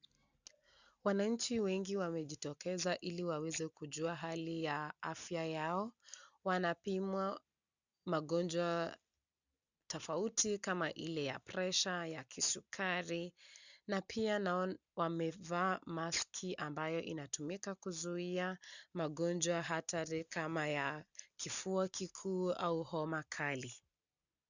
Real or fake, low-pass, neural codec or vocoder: real; 7.2 kHz; none